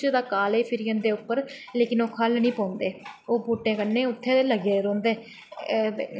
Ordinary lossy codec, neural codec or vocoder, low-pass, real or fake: none; none; none; real